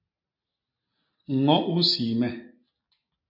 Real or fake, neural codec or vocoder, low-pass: real; none; 5.4 kHz